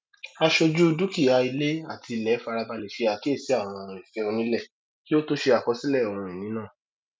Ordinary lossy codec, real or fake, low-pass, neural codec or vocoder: none; real; none; none